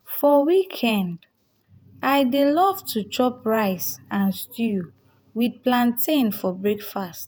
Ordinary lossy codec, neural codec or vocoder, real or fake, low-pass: none; vocoder, 48 kHz, 128 mel bands, Vocos; fake; none